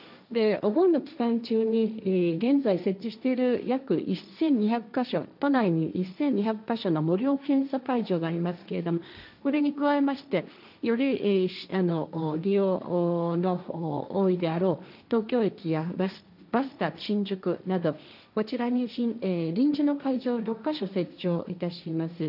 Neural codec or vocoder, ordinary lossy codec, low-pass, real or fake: codec, 16 kHz, 1.1 kbps, Voila-Tokenizer; none; 5.4 kHz; fake